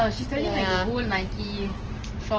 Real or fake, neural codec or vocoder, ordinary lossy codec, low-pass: real; none; Opus, 16 kbps; 7.2 kHz